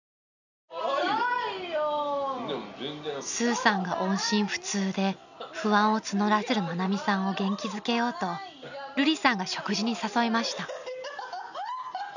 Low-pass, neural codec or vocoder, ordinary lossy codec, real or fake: 7.2 kHz; none; none; real